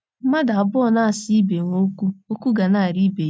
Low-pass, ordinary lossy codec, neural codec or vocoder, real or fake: none; none; none; real